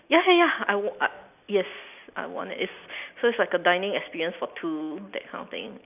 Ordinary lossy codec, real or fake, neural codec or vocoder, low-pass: none; real; none; 3.6 kHz